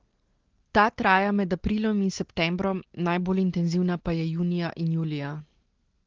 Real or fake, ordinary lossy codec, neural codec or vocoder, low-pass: real; Opus, 16 kbps; none; 7.2 kHz